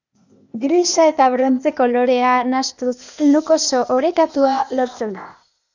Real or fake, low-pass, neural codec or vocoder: fake; 7.2 kHz; codec, 16 kHz, 0.8 kbps, ZipCodec